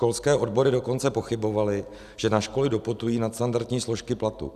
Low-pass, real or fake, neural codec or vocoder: 14.4 kHz; real; none